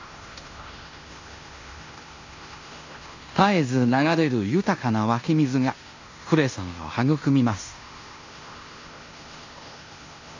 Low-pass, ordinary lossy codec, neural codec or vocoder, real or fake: 7.2 kHz; AAC, 48 kbps; codec, 16 kHz in and 24 kHz out, 0.9 kbps, LongCat-Audio-Codec, fine tuned four codebook decoder; fake